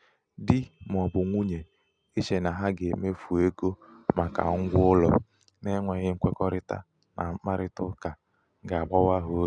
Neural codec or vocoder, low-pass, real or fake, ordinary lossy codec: none; 9.9 kHz; real; none